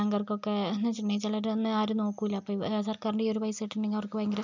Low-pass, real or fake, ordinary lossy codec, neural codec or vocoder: 7.2 kHz; real; none; none